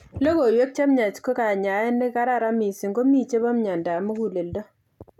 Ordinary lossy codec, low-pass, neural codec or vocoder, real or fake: none; 19.8 kHz; none; real